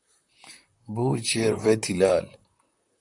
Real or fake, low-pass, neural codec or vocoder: fake; 10.8 kHz; vocoder, 44.1 kHz, 128 mel bands, Pupu-Vocoder